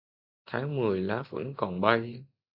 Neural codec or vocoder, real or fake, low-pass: none; real; 5.4 kHz